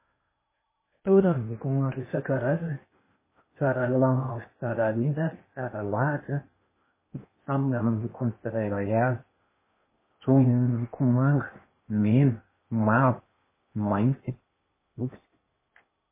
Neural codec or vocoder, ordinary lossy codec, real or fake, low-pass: codec, 16 kHz in and 24 kHz out, 0.8 kbps, FocalCodec, streaming, 65536 codes; MP3, 16 kbps; fake; 3.6 kHz